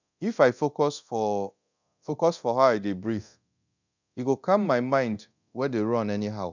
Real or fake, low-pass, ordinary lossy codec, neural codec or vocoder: fake; 7.2 kHz; none; codec, 24 kHz, 0.9 kbps, DualCodec